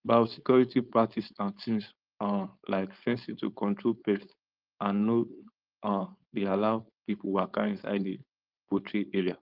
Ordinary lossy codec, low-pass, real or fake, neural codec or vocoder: Opus, 32 kbps; 5.4 kHz; fake; codec, 16 kHz, 4.8 kbps, FACodec